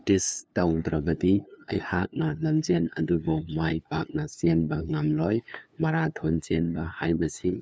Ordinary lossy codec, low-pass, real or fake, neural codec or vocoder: none; none; fake; codec, 16 kHz, 2 kbps, FunCodec, trained on LibriTTS, 25 frames a second